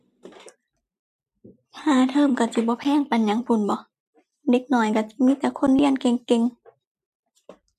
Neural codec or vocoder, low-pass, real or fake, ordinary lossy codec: vocoder, 44.1 kHz, 128 mel bands every 256 samples, BigVGAN v2; 14.4 kHz; fake; MP3, 96 kbps